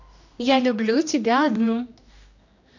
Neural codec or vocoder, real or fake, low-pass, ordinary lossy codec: codec, 16 kHz, 1 kbps, X-Codec, HuBERT features, trained on general audio; fake; 7.2 kHz; none